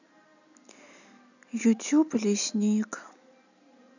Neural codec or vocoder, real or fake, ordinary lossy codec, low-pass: none; real; none; 7.2 kHz